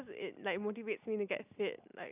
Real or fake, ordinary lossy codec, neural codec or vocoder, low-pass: real; none; none; 3.6 kHz